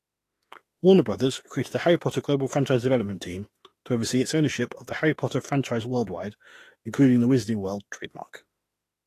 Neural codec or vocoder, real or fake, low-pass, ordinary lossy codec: autoencoder, 48 kHz, 32 numbers a frame, DAC-VAE, trained on Japanese speech; fake; 14.4 kHz; AAC, 48 kbps